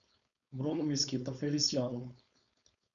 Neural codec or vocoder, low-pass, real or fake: codec, 16 kHz, 4.8 kbps, FACodec; 7.2 kHz; fake